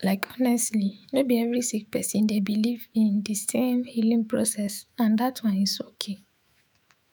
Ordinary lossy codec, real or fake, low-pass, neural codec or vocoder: none; fake; none; autoencoder, 48 kHz, 128 numbers a frame, DAC-VAE, trained on Japanese speech